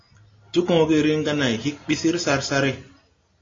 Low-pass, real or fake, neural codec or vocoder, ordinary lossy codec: 7.2 kHz; real; none; AAC, 32 kbps